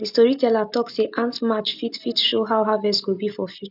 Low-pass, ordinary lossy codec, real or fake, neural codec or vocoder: 5.4 kHz; none; real; none